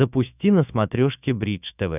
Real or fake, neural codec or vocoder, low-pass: fake; autoencoder, 48 kHz, 128 numbers a frame, DAC-VAE, trained on Japanese speech; 3.6 kHz